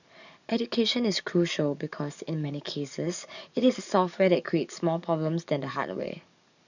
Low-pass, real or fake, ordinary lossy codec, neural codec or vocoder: 7.2 kHz; fake; none; codec, 44.1 kHz, 7.8 kbps, DAC